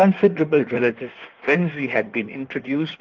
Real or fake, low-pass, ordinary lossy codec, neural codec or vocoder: fake; 7.2 kHz; Opus, 32 kbps; codec, 16 kHz in and 24 kHz out, 1.1 kbps, FireRedTTS-2 codec